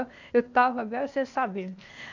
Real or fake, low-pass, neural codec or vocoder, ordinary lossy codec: fake; 7.2 kHz; codec, 16 kHz, 0.8 kbps, ZipCodec; none